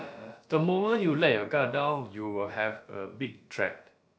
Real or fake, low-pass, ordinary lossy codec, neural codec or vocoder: fake; none; none; codec, 16 kHz, about 1 kbps, DyCAST, with the encoder's durations